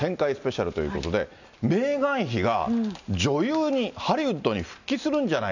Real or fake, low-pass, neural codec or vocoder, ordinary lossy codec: real; 7.2 kHz; none; none